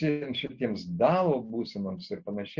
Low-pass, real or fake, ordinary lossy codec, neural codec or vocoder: 7.2 kHz; real; Opus, 64 kbps; none